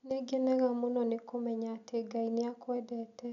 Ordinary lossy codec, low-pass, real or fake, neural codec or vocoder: none; 7.2 kHz; real; none